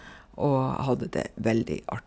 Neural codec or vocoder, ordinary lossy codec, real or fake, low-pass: none; none; real; none